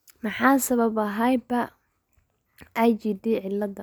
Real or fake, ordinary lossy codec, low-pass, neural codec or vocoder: real; none; none; none